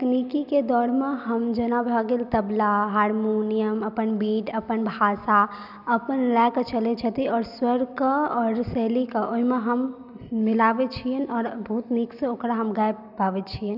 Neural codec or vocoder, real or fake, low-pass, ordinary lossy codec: none; real; 5.4 kHz; none